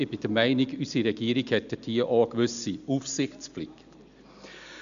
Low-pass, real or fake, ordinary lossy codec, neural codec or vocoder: 7.2 kHz; real; none; none